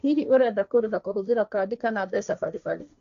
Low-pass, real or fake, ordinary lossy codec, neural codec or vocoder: 7.2 kHz; fake; MP3, 96 kbps; codec, 16 kHz, 1.1 kbps, Voila-Tokenizer